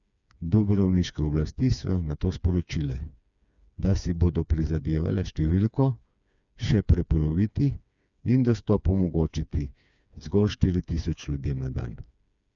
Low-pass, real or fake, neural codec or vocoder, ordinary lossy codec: 7.2 kHz; fake; codec, 16 kHz, 4 kbps, FreqCodec, smaller model; none